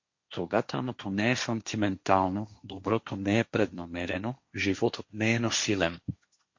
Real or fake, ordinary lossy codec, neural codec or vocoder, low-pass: fake; MP3, 48 kbps; codec, 16 kHz, 1.1 kbps, Voila-Tokenizer; 7.2 kHz